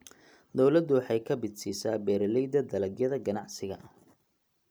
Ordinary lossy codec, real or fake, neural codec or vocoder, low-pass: none; real; none; none